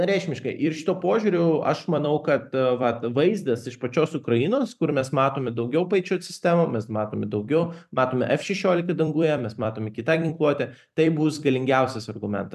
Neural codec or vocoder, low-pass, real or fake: vocoder, 44.1 kHz, 128 mel bands every 256 samples, BigVGAN v2; 14.4 kHz; fake